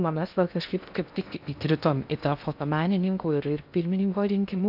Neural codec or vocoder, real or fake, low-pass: codec, 16 kHz in and 24 kHz out, 0.6 kbps, FocalCodec, streaming, 2048 codes; fake; 5.4 kHz